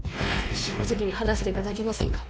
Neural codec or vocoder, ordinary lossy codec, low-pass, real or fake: codec, 16 kHz, 2 kbps, X-Codec, WavLM features, trained on Multilingual LibriSpeech; none; none; fake